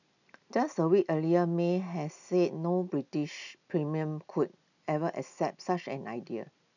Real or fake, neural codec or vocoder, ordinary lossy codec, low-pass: real; none; none; 7.2 kHz